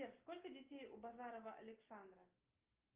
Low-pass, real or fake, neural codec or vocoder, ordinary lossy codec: 3.6 kHz; real; none; Opus, 24 kbps